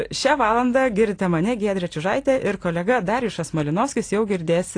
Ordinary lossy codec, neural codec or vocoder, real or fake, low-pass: AAC, 48 kbps; none; real; 9.9 kHz